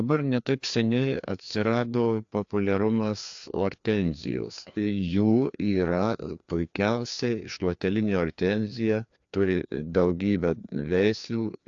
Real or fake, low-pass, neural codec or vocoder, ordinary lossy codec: fake; 7.2 kHz; codec, 16 kHz, 2 kbps, FreqCodec, larger model; AAC, 64 kbps